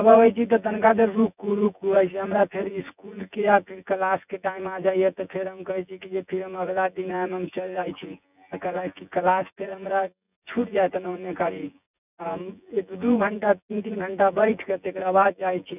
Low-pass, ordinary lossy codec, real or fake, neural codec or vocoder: 3.6 kHz; none; fake; vocoder, 24 kHz, 100 mel bands, Vocos